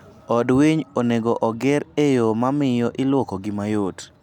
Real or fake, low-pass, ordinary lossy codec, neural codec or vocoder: real; 19.8 kHz; none; none